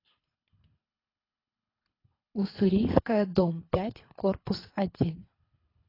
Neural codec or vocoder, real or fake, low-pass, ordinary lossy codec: codec, 24 kHz, 3 kbps, HILCodec; fake; 5.4 kHz; AAC, 24 kbps